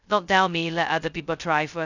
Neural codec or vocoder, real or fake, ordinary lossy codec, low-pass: codec, 16 kHz, 0.2 kbps, FocalCodec; fake; none; 7.2 kHz